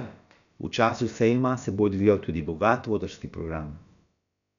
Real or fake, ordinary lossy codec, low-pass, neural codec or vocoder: fake; none; 7.2 kHz; codec, 16 kHz, about 1 kbps, DyCAST, with the encoder's durations